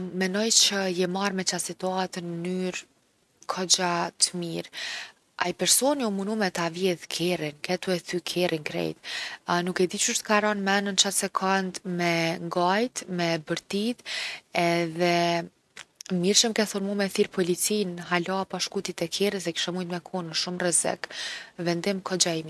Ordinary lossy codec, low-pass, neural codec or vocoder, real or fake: none; none; none; real